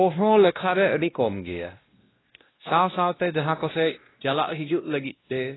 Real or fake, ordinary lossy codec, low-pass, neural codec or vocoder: fake; AAC, 16 kbps; 7.2 kHz; codec, 16 kHz, 1 kbps, X-Codec, WavLM features, trained on Multilingual LibriSpeech